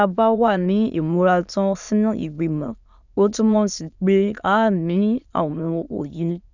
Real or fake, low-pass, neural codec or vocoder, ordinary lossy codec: fake; 7.2 kHz; autoencoder, 22.05 kHz, a latent of 192 numbers a frame, VITS, trained on many speakers; none